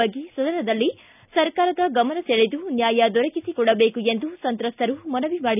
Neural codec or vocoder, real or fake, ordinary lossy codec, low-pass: none; real; none; 3.6 kHz